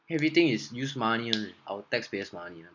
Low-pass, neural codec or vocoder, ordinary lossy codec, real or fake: 7.2 kHz; none; MP3, 64 kbps; real